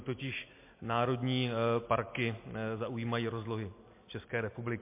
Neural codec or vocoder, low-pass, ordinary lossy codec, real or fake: none; 3.6 kHz; MP3, 24 kbps; real